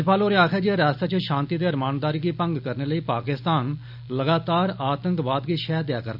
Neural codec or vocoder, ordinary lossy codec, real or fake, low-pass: none; AAC, 48 kbps; real; 5.4 kHz